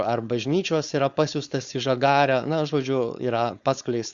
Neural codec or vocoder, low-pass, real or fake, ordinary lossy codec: codec, 16 kHz, 4.8 kbps, FACodec; 7.2 kHz; fake; Opus, 64 kbps